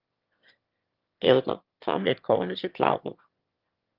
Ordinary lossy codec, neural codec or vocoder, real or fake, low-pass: Opus, 32 kbps; autoencoder, 22.05 kHz, a latent of 192 numbers a frame, VITS, trained on one speaker; fake; 5.4 kHz